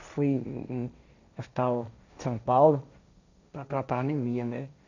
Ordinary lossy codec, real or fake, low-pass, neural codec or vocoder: none; fake; 7.2 kHz; codec, 16 kHz, 1.1 kbps, Voila-Tokenizer